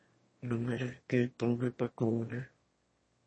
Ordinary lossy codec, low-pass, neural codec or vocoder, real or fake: MP3, 32 kbps; 9.9 kHz; autoencoder, 22.05 kHz, a latent of 192 numbers a frame, VITS, trained on one speaker; fake